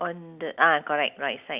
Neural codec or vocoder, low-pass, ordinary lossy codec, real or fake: none; 3.6 kHz; Opus, 64 kbps; real